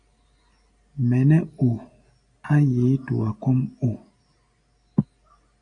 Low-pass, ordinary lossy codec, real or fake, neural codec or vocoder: 9.9 kHz; AAC, 64 kbps; real; none